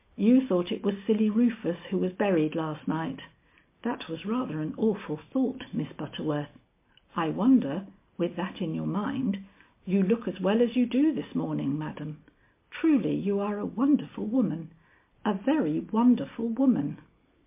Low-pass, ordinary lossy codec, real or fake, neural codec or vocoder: 3.6 kHz; MP3, 24 kbps; real; none